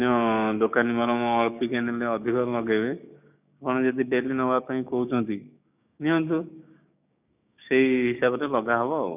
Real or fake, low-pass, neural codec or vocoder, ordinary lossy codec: fake; 3.6 kHz; codec, 16 kHz, 6 kbps, DAC; none